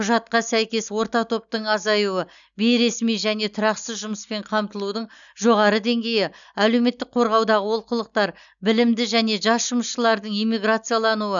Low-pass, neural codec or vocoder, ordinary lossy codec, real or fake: 7.2 kHz; none; none; real